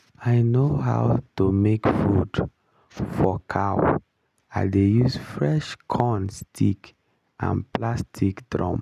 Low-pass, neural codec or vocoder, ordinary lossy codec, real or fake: 14.4 kHz; none; none; real